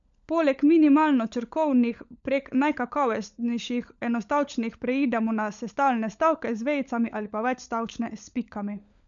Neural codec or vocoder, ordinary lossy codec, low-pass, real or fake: codec, 16 kHz, 16 kbps, FunCodec, trained on LibriTTS, 50 frames a second; none; 7.2 kHz; fake